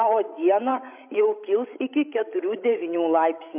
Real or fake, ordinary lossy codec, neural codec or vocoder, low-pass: fake; MP3, 32 kbps; codec, 16 kHz, 16 kbps, FreqCodec, larger model; 3.6 kHz